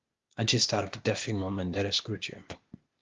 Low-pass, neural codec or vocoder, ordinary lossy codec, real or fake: 7.2 kHz; codec, 16 kHz, 0.8 kbps, ZipCodec; Opus, 32 kbps; fake